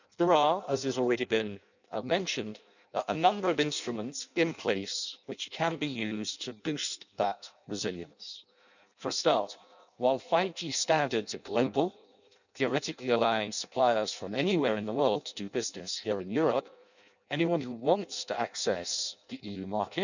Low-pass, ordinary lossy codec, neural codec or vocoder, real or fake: 7.2 kHz; none; codec, 16 kHz in and 24 kHz out, 0.6 kbps, FireRedTTS-2 codec; fake